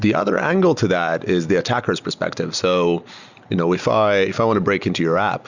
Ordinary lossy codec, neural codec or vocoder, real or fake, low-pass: Opus, 64 kbps; none; real; 7.2 kHz